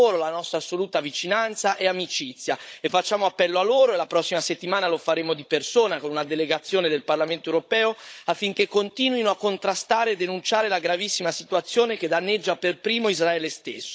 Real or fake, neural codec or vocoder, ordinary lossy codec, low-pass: fake; codec, 16 kHz, 16 kbps, FunCodec, trained on Chinese and English, 50 frames a second; none; none